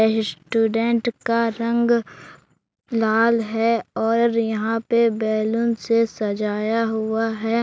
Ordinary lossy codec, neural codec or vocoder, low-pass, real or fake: none; none; none; real